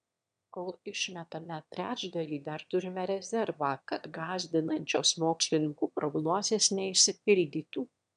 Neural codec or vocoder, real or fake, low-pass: autoencoder, 22.05 kHz, a latent of 192 numbers a frame, VITS, trained on one speaker; fake; 9.9 kHz